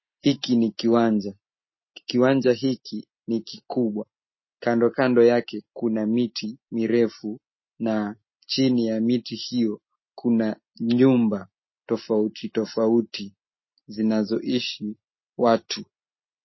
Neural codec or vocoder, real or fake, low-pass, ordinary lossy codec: none; real; 7.2 kHz; MP3, 24 kbps